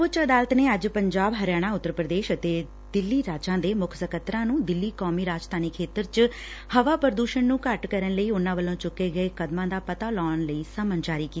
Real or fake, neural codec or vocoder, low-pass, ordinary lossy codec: real; none; none; none